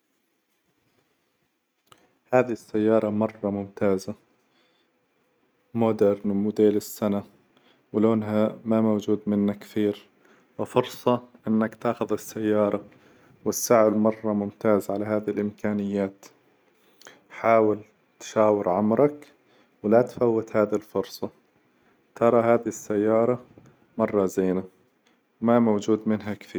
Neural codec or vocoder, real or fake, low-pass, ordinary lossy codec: none; real; none; none